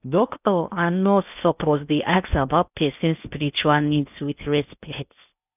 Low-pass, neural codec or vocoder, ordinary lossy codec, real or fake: 3.6 kHz; codec, 16 kHz in and 24 kHz out, 0.6 kbps, FocalCodec, streaming, 2048 codes; none; fake